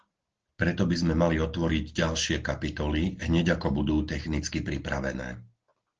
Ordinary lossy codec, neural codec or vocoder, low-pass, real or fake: Opus, 16 kbps; none; 7.2 kHz; real